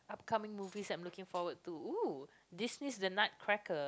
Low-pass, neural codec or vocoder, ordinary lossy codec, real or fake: none; none; none; real